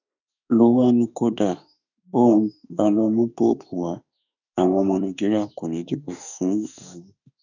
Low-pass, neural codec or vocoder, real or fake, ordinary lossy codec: 7.2 kHz; autoencoder, 48 kHz, 32 numbers a frame, DAC-VAE, trained on Japanese speech; fake; none